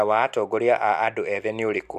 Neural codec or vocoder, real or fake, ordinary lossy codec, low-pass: none; real; none; 14.4 kHz